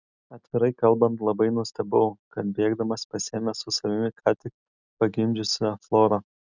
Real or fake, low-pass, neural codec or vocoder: real; 7.2 kHz; none